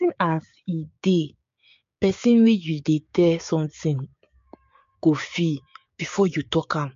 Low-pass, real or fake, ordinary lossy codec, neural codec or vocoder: 7.2 kHz; fake; MP3, 48 kbps; codec, 16 kHz, 6 kbps, DAC